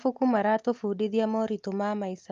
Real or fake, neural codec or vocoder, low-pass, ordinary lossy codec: real; none; 7.2 kHz; Opus, 32 kbps